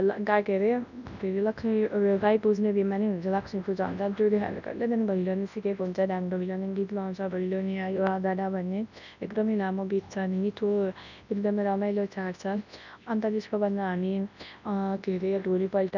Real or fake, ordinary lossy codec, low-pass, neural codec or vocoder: fake; none; 7.2 kHz; codec, 24 kHz, 0.9 kbps, WavTokenizer, large speech release